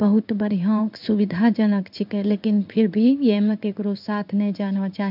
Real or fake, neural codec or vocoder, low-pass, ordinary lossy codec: fake; codec, 16 kHz in and 24 kHz out, 1 kbps, XY-Tokenizer; 5.4 kHz; none